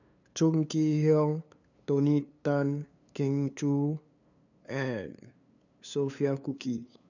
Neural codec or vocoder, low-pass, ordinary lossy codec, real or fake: codec, 16 kHz, 2 kbps, FunCodec, trained on LibriTTS, 25 frames a second; 7.2 kHz; none; fake